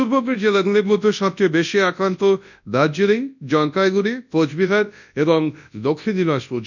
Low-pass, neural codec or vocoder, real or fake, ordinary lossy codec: 7.2 kHz; codec, 24 kHz, 0.9 kbps, WavTokenizer, large speech release; fake; none